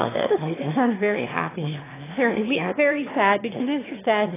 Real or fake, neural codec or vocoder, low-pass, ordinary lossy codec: fake; autoencoder, 22.05 kHz, a latent of 192 numbers a frame, VITS, trained on one speaker; 3.6 kHz; AAC, 16 kbps